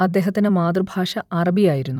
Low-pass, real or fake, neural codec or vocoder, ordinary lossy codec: 19.8 kHz; fake; vocoder, 44.1 kHz, 128 mel bands every 256 samples, BigVGAN v2; none